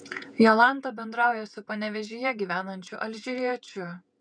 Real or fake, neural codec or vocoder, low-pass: fake; vocoder, 48 kHz, 128 mel bands, Vocos; 9.9 kHz